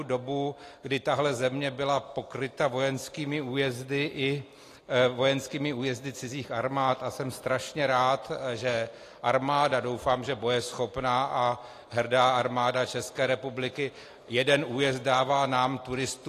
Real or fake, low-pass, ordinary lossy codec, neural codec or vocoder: real; 14.4 kHz; AAC, 48 kbps; none